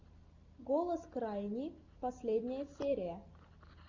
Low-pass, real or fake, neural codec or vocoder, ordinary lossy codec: 7.2 kHz; real; none; MP3, 64 kbps